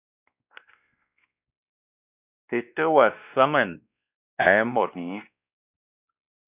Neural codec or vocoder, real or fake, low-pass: codec, 16 kHz, 1 kbps, X-Codec, WavLM features, trained on Multilingual LibriSpeech; fake; 3.6 kHz